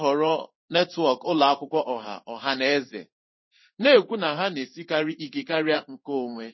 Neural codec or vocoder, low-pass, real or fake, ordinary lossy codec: codec, 16 kHz in and 24 kHz out, 1 kbps, XY-Tokenizer; 7.2 kHz; fake; MP3, 24 kbps